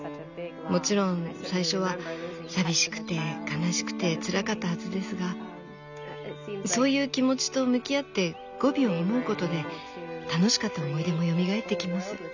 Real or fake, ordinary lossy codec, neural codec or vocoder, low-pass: real; none; none; 7.2 kHz